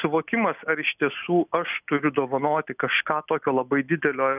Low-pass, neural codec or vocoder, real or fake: 3.6 kHz; none; real